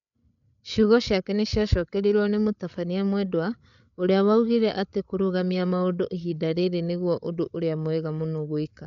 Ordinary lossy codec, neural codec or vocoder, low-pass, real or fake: none; codec, 16 kHz, 8 kbps, FreqCodec, larger model; 7.2 kHz; fake